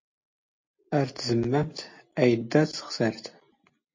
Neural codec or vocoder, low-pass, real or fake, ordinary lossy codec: none; 7.2 kHz; real; MP3, 32 kbps